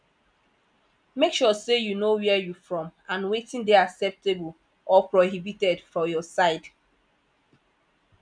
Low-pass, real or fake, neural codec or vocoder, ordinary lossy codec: 9.9 kHz; real; none; none